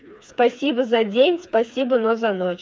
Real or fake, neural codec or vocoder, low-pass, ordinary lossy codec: fake; codec, 16 kHz, 4 kbps, FreqCodec, smaller model; none; none